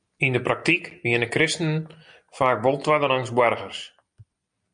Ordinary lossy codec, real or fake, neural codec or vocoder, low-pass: MP3, 96 kbps; real; none; 9.9 kHz